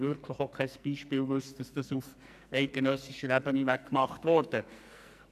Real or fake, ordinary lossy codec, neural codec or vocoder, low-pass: fake; none; codec, 44.1 kHz, 2.6 kbps, SNAC; 14.4 kHz